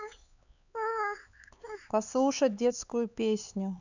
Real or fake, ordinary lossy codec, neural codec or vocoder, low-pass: fake; none; codec, 16 kHz, 4 kbps, X-Codec, HuBERT features, trained on LibriSpeech; 7.2 kHz